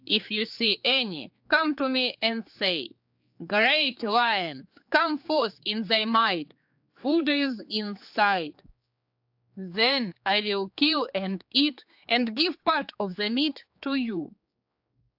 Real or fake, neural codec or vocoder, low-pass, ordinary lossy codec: fake; codec, 16 kHz, 4 kbps, X-Codec, HuBERT features, trained on general audio; 5.4 kHz; AAC, 48 kbps